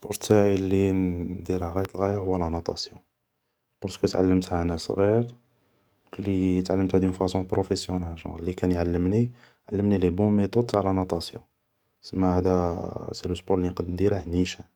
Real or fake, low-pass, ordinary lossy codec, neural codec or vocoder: fake; none; none; codec, 44.1 kHz, 7.8 kbps, DAC